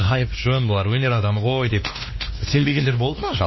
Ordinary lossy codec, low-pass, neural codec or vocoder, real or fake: MP3, 24 kbps; 7.2 kHz; codec, 16 kHz, 2 kbps, X-Codec, WavLM features, trained on Multilingual LibriSpeech; fake